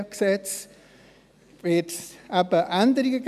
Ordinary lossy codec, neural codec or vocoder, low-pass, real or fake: none; none; 14.4 kHz; real